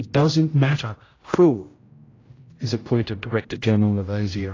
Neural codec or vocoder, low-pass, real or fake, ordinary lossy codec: codec, 16 kHz, 0.5 kbps, X-Codec, HuBERT features, trained on general audio; 7.2 kHz; fake; AAC, 32 kbps